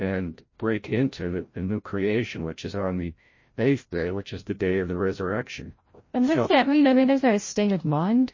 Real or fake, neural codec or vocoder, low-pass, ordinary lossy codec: fake; codec, 16 kHz, 0.5 kbps, FreqCodec, larger model; 7.2 kHz; MP3, 32 kbps